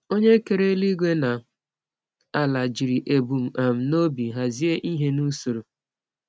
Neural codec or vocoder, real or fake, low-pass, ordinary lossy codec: none; real; none; none